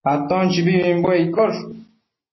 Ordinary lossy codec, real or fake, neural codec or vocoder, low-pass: MP3, 24 kbps; real; none; 7.2 kHz